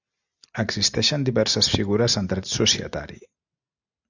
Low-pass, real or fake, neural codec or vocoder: 7.2 kHz; real; none